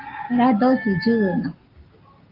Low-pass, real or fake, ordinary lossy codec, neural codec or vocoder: 5.4 kHz; real; Opus, 32 kbps; none